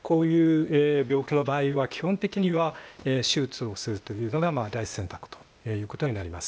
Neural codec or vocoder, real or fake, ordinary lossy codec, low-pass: codec, 16 kHz, 0.8 kbps, ZipCodec; fake; none; none